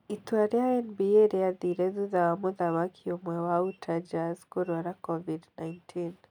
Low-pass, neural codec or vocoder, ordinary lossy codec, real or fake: 19.8 kHz; none; none; real